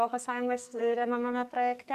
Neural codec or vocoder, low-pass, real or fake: codec, 44.1 kHz, 2.6 kbps, SNAC; 14.4 kHz; fake